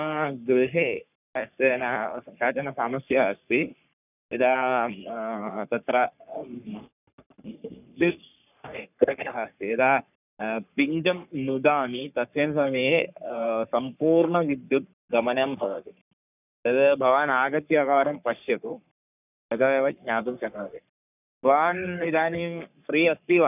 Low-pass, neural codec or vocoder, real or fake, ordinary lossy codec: 3.6 kHz; codec, 44.1 kHz, 3.4 kbps, Pupu-Codec; fake; none